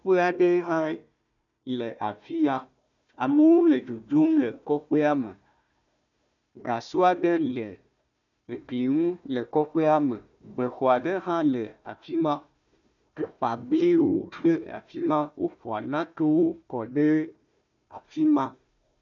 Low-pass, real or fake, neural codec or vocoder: 7.2 kHz; fake; codec, 16 kHz, 1 kbps, FunCodec, trained on Chinese and English, 50 frames a second